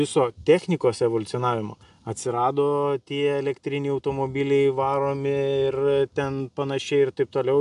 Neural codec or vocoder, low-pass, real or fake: none; 10.8 kHz; real